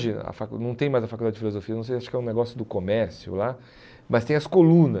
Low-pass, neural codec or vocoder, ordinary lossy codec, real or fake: none; none; none; real